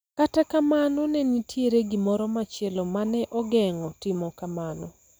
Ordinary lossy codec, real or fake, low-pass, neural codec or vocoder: none; real; none; none